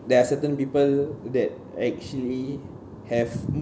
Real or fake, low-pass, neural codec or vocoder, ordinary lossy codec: real; none; none; none